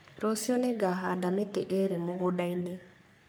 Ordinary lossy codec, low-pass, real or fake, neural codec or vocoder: none; none; fake; codec, 44.1 kHz, 3.4 kbps, Pupu-Codec